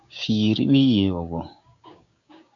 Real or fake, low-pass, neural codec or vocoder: fake; 7.2 kHz; codec, 16 kHz, 6 kbps, DAC